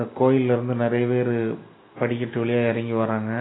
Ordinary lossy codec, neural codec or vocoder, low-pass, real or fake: AAC, 16 kbps; none; 7.2 kHz; real